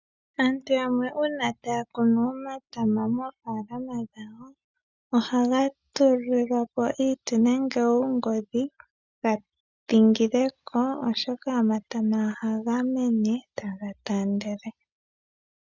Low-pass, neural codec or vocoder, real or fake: 7.2 kHz; none; real